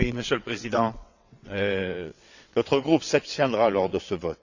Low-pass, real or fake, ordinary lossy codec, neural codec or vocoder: 7.2 kHz; fake; none; vocoder, 22.05 kHz, 80 mel bands, WaveNeXt